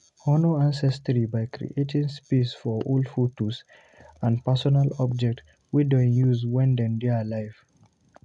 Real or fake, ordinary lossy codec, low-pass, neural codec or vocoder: real; MP3, 64 kbps; 10.8 kHz; none